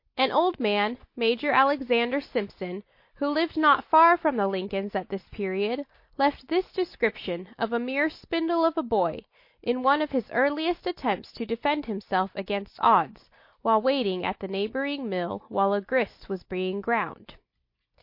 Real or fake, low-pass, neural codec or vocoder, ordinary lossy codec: real; 5.4 kHz; none; MP3, 32 kbps